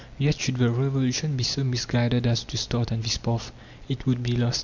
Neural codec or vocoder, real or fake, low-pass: none; real; 7.2 kHz